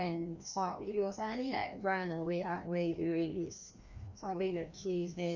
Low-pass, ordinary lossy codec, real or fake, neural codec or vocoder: 7.2 kHz; none; fake; codec, 16 kHz, 1 kbps, FreqCodec, larger model